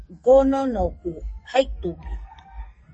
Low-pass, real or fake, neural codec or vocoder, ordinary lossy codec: 10.8 kHz; fake; codec, 44.1 kHz, 2.6 kbps, SNAC; MP3, 32 kbps